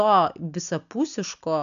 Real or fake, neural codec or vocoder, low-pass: real; none; 7.2 kHz